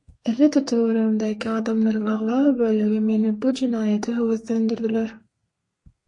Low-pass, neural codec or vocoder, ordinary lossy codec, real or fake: 10.8 kHz; codec, 32 kHz, 1.9 kbps, SNAC; MP3, 48 kbps; fake